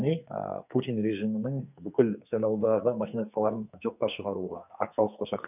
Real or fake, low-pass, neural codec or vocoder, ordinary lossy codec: fake; 3.6 kHz; codec, 44.1 kHz, 2.6 kbps, SNAC; none